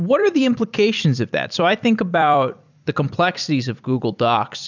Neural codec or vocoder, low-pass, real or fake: vocoder, 44.1 kHz, 128 mel bands every 256 samples, BigVGAN v2; 7.2 kHz; fake